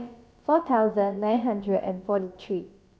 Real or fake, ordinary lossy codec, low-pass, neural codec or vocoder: fake; none; none; codec, 16 kHz, about 1 kbps, DyCAST, with the encoder's durations